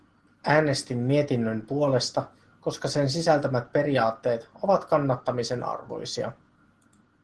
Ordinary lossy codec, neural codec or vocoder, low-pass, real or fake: Opus, 16 kbps; none; 10.8 kHz; real